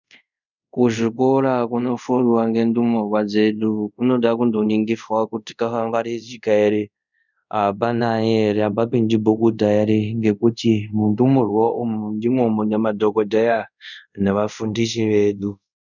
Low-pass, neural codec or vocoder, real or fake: 7.2 kHz; codec, 24 kHz, 0.5 kbps, DualCodec; fake